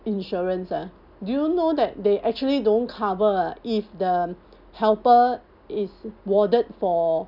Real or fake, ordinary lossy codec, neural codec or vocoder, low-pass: real; none; none; 5.4 kHz